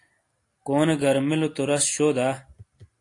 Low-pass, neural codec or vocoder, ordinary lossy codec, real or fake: 10.8 kHz; none; AAC, 48 kbps; real